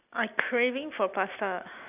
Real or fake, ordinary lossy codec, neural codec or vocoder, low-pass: real; none; none; 3.6 kHz